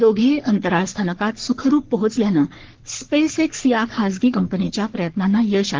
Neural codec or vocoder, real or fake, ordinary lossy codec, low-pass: codec, 44.1 kHz, 3.4 kbps, Pupu-Codec; fake; Opus, 16 kbps; 7.2 kHz